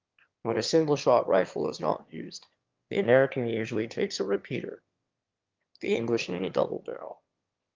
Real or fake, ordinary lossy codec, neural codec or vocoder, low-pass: fake; Opus, 32 kbps; autoencoder, 22.05 kHz, a latent of 192 numbers a frame, VITS, trained on one speaker; 7.2 kHz